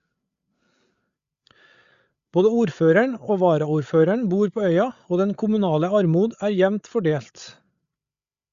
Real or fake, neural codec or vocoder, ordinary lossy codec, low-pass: fake; codec, 16 kHz, 8 kbps, FreqCodec, larger model; Opus, 64 kbps; 7.2 kHz